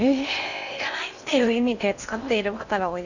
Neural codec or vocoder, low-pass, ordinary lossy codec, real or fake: codec, 16 kHz in and 24 kHz out, 0.8 kbps, FocalCodec, streaming, 65536 codes; 7.2 kHz; none; fake